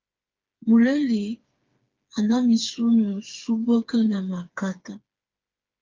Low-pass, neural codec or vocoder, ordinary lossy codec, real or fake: 7.2 kHz; codec, 16 kHz, 4 kbps, FreqCodec, smaller model; Opus, 24 kbps; fake